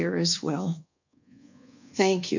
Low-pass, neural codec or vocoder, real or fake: 7.2 kHz; codec, 24 kHz, 1.2 kbps, DualCodec; fake